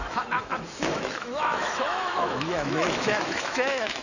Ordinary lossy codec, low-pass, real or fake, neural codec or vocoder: none; 7.2 kHz; fake; autoencoder, 48 kHz, 128 numbers a frame, DAC-VAE, trained on Japanese speech